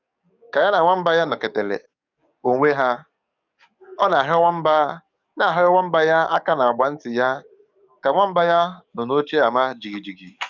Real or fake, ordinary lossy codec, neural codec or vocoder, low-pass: fake; none; codec, 44.1 kHz, 7.8 kbps, DAC; 7.2 kHz